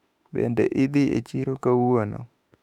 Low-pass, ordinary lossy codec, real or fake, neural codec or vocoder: 19.8 kHz; none; fake; autoencoder, 48 kHz, 32 numbers a frame, DAC-VAE, trained on Japanese speech